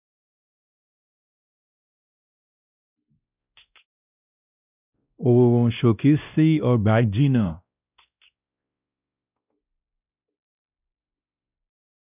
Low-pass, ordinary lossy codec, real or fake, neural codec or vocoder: 3.6 kHz; none; fake; codec, 16 kHz, 1 kbps, X-Codec, WavLM features, trained on Multilingual LibriSpeech